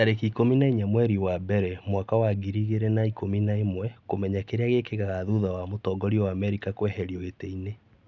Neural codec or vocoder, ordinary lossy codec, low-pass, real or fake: none; none; 7.2 kHz; real